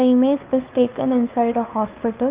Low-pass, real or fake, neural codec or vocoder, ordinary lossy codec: 3.6 kHz; fake; codec, 16 kHz, 2 kbps, FunCodec, trained on Chinese and English, 25 frames a second; Opus, 24 kbps